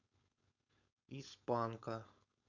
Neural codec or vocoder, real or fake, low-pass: codec, 16 kHz, 4.8 kbps, FACodec; fake; 7.2 kHz